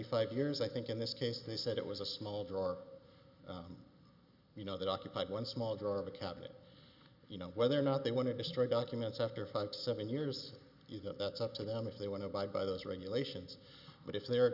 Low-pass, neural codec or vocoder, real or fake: 5.4 kHz; none; real